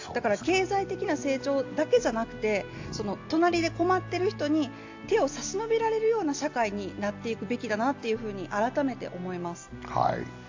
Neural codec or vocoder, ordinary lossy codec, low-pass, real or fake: none; AAC, 48 kbps; 7.2 kHz; real